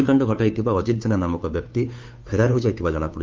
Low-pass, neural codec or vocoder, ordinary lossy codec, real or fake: 7.2 kHz; codec, 16 kHz, 2 kbps, FunCodec, trained on Chinese and English, 25 frames a second; Opus, 24 kbps; fake